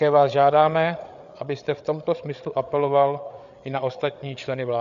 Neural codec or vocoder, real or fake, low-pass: codec, 16 kHz, 8 kbps, FreqCodec, larger model; fake; 7.2 kHz